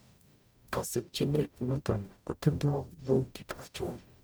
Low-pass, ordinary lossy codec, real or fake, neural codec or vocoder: none; none; fake; codec, 44.1 kHz, 0.9 kbps, DAC